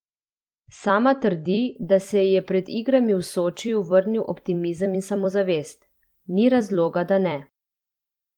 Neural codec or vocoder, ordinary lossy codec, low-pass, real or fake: vocoder, 44.1 kHz, 128 mel bands every 256 samples, BigVGAN v2; Opus, 24 kbps; 19.8 kHz; fake